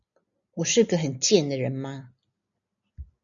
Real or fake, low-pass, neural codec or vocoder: real; 7.2 kHz; none